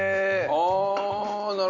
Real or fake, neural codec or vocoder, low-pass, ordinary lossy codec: real; none; 7.2 kHz; none